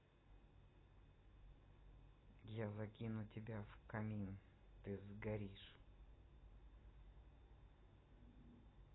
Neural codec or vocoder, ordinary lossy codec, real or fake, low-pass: none; AAC, 16 kbps; real; 7.2 kHz